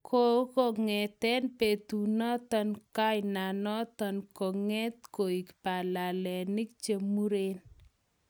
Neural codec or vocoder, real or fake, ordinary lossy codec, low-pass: none; real; none; none